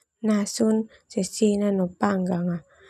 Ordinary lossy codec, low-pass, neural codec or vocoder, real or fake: none; 14.4 kHz; none; real